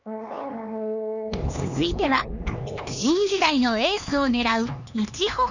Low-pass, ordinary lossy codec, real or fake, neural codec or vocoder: 7.2 kHz; none; fake; codec, 16 kHz, 2 kbps, X-Codec, HuBERT features, trained on LibriSpeech